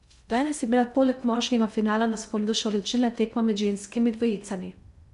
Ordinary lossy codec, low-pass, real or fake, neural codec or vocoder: none; 10.8 kHz; fake; codec, 16 kHz in and 24 kHz out, 0.6 kbps, FocalCodec, streaming, 2048 codes